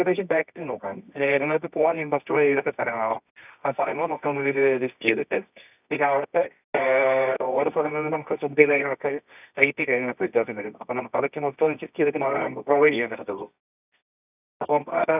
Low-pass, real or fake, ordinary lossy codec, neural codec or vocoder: 3.6 kHz; fake; none; codec, 24 kHz, 0.9 kbps, WavTokenizer, medium music audio release